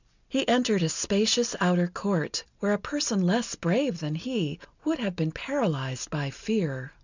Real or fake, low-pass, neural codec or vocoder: real; 7.2 kHz; none